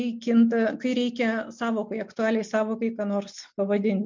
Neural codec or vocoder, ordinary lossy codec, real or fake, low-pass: none; MP3, 64 kbps; real; 7.2 kHz